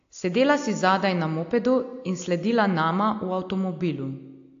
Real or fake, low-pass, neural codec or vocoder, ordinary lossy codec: real; 7.2 kHz; none; AAC, 48 kbps